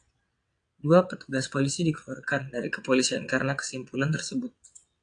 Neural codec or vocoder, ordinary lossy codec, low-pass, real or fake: vocoder, 22.05 kHz, 80 mel bands, WaveNeXt; AAC, 64 kbps; 9.9 kHz; fake